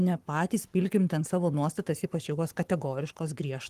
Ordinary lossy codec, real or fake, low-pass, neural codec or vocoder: Opus, 24 kbps; fake; 14.4 kHz; codec, 44.1 kHz, 7.8 kbps, Pupu-Codec